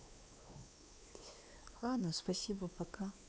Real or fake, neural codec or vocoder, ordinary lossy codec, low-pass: fake; codec, 16 kHz, 2 kbps, X-Codec, WavLM features, trained on Multilingual LibriSpeech; none; none